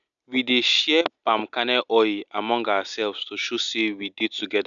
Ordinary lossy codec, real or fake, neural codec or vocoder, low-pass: none; real; none; 7.2 kHz